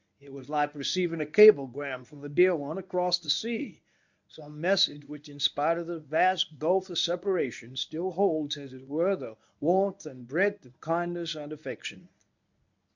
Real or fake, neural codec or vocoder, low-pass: fake; codec, 24 kHz, 0.9 kbps, WavTokenizer, medium speech release version 1; 7.2 kHz